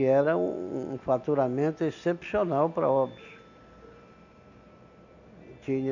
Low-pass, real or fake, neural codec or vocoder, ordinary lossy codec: 7.2 kHz; real; none; none